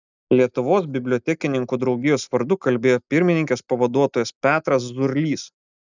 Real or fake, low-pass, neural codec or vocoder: real; 7.2 kHz; none